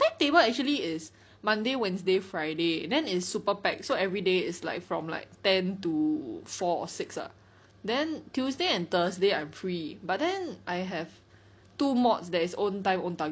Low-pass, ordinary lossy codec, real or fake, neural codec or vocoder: none; none; real; none